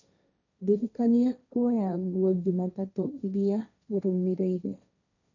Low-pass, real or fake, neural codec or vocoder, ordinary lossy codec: 7.2 kHz; fake; codec, 16 kHz, 1.1 kbps, Voila-Tokenizer; none